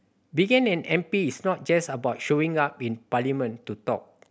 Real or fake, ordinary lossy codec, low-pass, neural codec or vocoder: real; none; none; none